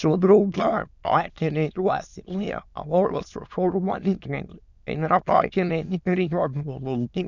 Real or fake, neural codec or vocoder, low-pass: fake; autoencoder, 22.05 kHz, a latent of 192 numbers a frame, VITS, trained on many speakers; 7.2 kHz